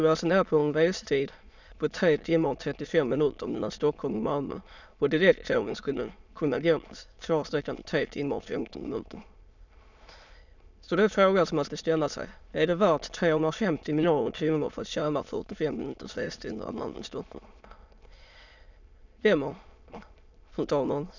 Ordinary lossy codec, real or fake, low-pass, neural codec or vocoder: none; fake; 7.2 kHz; autoencoder, 22.05 kHz, a latent of 192 numbers a frame, VITS, trained on many speakers